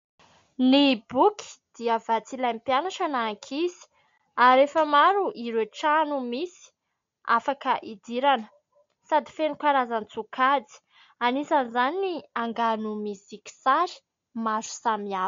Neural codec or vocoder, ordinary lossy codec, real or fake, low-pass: none; MP3, 48 kbps; real; 7.2 kHz